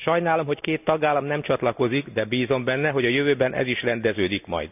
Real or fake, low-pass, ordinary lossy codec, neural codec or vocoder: real; 3.6 kHz; none; none